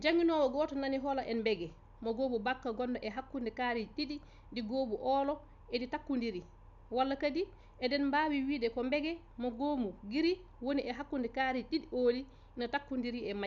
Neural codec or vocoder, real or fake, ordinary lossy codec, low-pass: none; real; none; 7.2 kHz